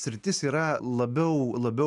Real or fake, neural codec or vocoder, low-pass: real; none; 10.8 kHz